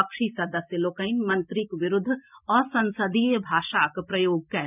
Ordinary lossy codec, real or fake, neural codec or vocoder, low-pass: none; real; none; 3.6 kHz